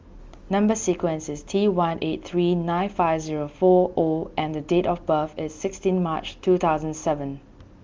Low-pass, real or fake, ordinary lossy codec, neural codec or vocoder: 7.2 kHz; real; Opus, 32 kbps; none